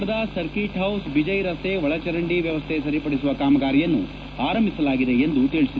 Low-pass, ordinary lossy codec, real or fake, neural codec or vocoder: none; none; real; none